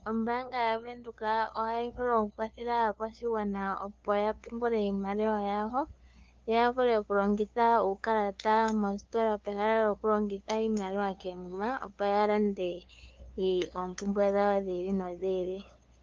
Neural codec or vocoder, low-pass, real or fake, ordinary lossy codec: codec, 16 kHz, 2 kbps, FunCodec, trained on LibriTTS, 25 frames a second; 7.2 kHz; fake; Opus, 24 kbps